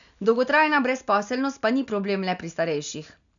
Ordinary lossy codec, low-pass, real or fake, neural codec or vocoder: none; 7.2 kHz; real; none